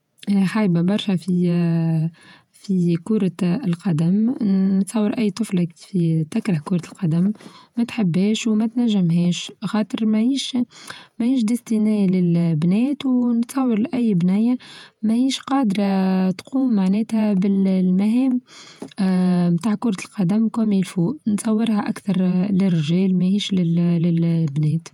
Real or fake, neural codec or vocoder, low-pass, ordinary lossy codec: fake; vocoder, 48 kHz, 128 mel bands, Vocos; 19.8 kHz; none